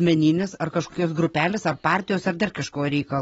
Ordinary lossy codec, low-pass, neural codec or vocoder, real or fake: AAC, 24 kbps; 10.8 kHz; none; real